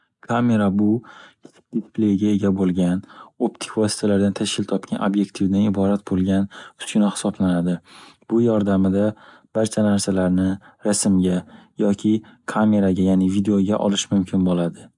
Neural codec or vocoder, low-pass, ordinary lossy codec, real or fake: none; 10.8 kHz; MP3, 96 kbps; real